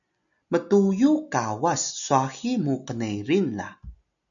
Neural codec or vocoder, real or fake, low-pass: none; real; 7.2 kHz